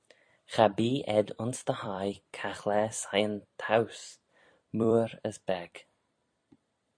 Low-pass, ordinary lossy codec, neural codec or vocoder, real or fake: 9.9 kHz; MP3, 48 kbps; vocoder, 44.1 kHz, 128 mel bands every 256 samples, BigVGAN v2; fake